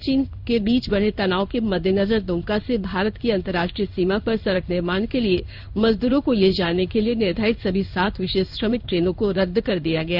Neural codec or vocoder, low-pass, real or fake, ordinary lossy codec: codec, 16 kHz in and 24 kHz out, 1 kbps, XY-Tokenizer; 5.4 kHz; fake; none